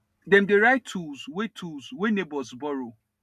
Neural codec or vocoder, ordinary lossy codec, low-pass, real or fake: none; none; 14.4 kHz; real